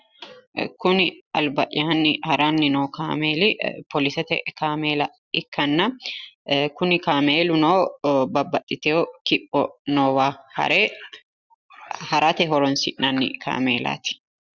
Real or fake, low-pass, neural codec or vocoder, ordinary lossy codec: real; 7.2 kHz; none; Opus, 64 kbps